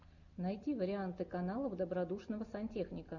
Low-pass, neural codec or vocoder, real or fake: 7.2 kHz; none; real